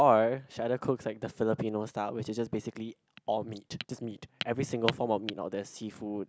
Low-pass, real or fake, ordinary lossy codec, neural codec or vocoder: none; real; none; none